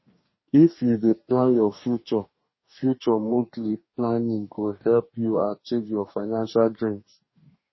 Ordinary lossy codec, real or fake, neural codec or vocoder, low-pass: MP3, 24 kbps; fake; codec, 44.1 kHz, 2.6 kbps, DAC; 7.2 kHz